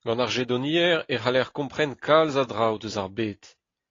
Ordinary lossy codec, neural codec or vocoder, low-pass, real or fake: AAC, 32 kbps; none; 7.2 kHz; real